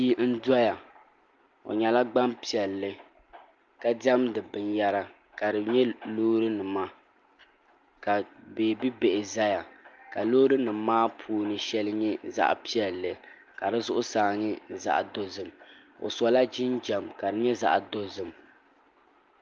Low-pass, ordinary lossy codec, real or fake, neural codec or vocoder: 7.2 kHz; Opus, 24 kbps; real; none